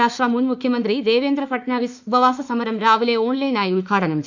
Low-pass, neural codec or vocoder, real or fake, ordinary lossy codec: 7.2 kHz; autoencoder, 48 kHz, 32 numbers a frame, DAC-VAE, trained on Japanese speech; fake; none